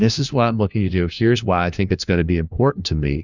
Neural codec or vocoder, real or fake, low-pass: codec, 16 kHz, 1 kbps, FunCodec, trained on LibriTTS, 50 frames a second; fake; 7.2 kHz